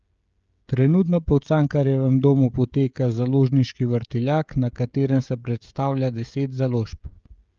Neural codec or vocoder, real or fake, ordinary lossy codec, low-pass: codec, 16 kHz, 16 kbps, FreqCodec, smaller model; fake; Opus, 24 kbps; 7.2 kHz